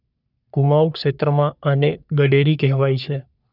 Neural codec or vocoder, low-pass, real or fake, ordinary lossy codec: codec, 44.1 kHz, 3.4 kbps, Pupu-Codec; 5.4 kHz; fake; none